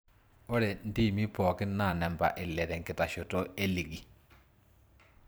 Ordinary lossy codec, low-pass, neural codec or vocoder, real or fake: none; none; vocoder, 44.1 kHz, 128 mel bands every 512 samples, BigVGAN v2; fake